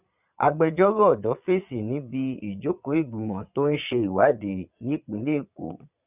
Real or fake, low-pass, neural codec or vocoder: fake; 3.6 kHz; vocoder, 22.05 kHz, 80 mel bands, Vocos